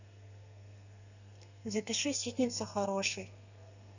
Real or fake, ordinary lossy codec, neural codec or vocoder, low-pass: fake; none; codec, 32 kHz, 1.9 kbps, SNAC; 7.2 kHz